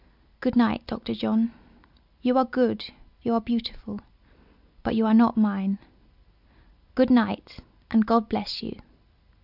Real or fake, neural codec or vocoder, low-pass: real; none; 5.4 kHz